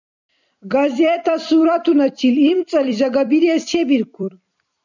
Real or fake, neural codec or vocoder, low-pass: fake; vocoder, 44.1 kHz, 128 mel bands every 256 samples, BigVGAN v2; 7.2 kHz